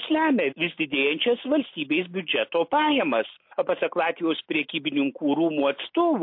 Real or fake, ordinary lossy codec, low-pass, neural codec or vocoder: real; MP3, 32 kbps; 5.4 kHz; none